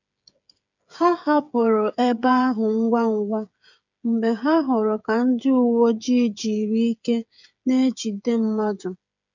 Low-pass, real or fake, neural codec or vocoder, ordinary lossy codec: 7.2 kHz; fake; codec, 16 kHz, 8 kbps, FreqCodec, smaller model; none